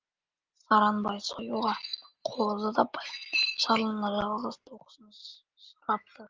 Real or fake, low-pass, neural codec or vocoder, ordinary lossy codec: real; 7.2 kHz; none; Opus, 32 kbps